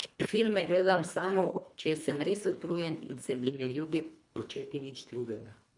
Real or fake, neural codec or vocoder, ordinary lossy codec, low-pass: fake; codec, 24 kHz, 1.5 kbps, HILCodec; none; 10.8 kHz